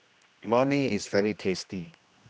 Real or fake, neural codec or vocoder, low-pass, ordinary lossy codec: fake; codec, 16 kHz, 1 kbps, X-Codec, HuBERT features, trained on general audio; none; none